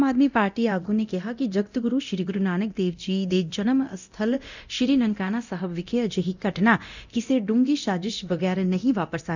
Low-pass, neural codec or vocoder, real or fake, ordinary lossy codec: 7.2 kHz; codec, 24 kHz, 0.9 kbps, DualCodec; fake; none